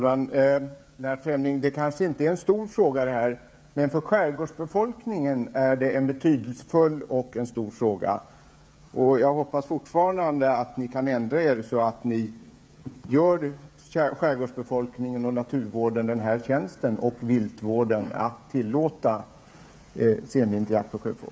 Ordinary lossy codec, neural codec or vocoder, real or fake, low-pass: none; codec, 16 kHz, 16 kbps, FreqCodec, smaller model; fake; none